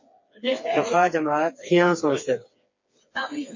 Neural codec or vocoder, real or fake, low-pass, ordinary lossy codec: codec, 16 kHz, 4 kbps, FreqCodec, smaller model; fake; 7.2 kHz; MP3, 32 kbps